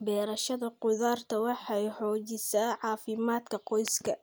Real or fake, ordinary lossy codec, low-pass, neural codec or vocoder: real; none; none; none